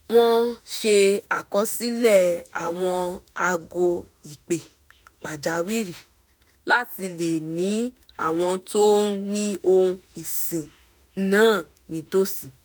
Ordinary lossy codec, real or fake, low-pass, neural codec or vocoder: none; fake; none; autoencoder, 48 kHz, 32 numbers a frame, DAC-VAE, trained on Japanese speech